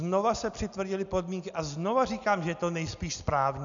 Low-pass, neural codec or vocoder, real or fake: 7.2 kHz; none; real